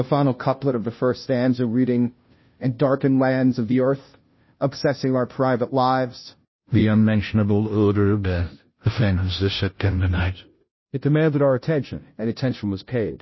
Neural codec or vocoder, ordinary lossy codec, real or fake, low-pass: codec, 16 kHz, 0.5 kbps, FunCodec, trained on Chinese and English, 25 frames a second; MP3, 24 kbps; fake; 7.2 kHz